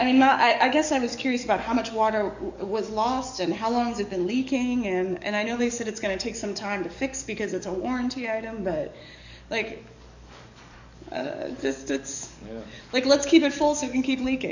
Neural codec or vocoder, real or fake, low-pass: codec, 44.1 kHz, 7.8 kbps, DAC; fake; 7.2 kHz